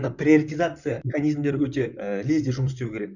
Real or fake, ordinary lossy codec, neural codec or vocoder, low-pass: fake; none; vocoder, 44.1 kHz, 128 mel bands, Pupu-Vocoder; 7.2 kHz